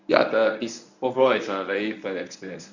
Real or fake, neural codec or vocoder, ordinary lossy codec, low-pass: fake; codec, 24 kHz, 0.9 kbps, WavTokenizer, medium speech release version 1; none; 7.2 kHz